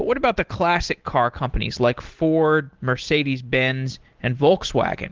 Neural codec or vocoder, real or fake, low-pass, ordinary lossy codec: none; real; 7.2 kHz; Opus, 16 kbps